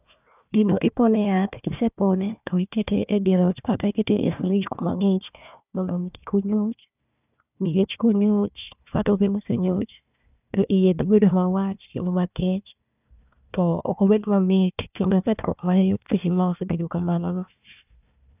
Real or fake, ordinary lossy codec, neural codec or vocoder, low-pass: fake; none; codec, 16 kHz, 1 kbps, FunCodec, trained on Chinese and English, 50 frames a second; 3.6 kHz